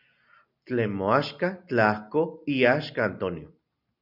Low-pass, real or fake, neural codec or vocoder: 5.4 kHz; real; none